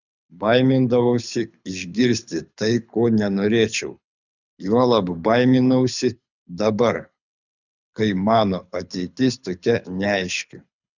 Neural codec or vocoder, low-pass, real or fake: codec, 24 kHz, 6 kbps, HILCodec; 7.2 kHz; fake